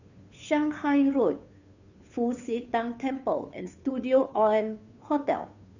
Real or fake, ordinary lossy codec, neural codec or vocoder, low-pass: fake; none; codec, 16 kHz, 2 kbps, FunCodec, trained on Chinese and English, 25 frames a second; 7.2 kHz